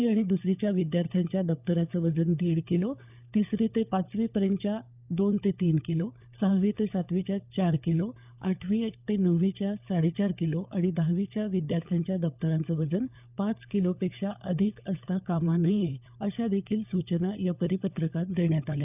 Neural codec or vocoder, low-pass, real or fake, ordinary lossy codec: codec, 16 kHz, 16 kbps, FunCodec, trained on LibriTTS, 50 frames a second; 3.6 kHz; fake; none